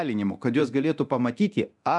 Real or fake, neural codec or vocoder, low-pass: fake; codec, 24 kHz, 0.9 kbps, DualCodec; 10.8 kHz